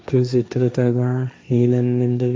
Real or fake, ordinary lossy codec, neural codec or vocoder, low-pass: fake; none; codec, 16 kHz, 1.1 kbps, Voila-Tokenizer; none